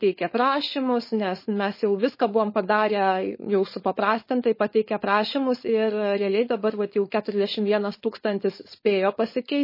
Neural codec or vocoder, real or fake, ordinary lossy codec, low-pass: codec, 16 kHz, 4.8 kbps, FACodec; fake; MP3, 24 kbps; 5.4 kHz